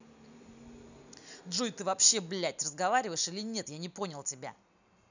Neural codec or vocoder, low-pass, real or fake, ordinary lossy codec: none; 7.2 kHz; real; none